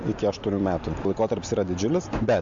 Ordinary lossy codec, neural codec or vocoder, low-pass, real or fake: MP3, 48 kbps; none; 7.2 kHz; real